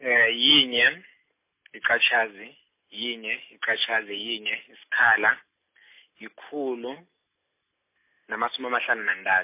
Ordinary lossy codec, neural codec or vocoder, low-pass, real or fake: MP3, 24 kbps; none; 3.6 kHz; real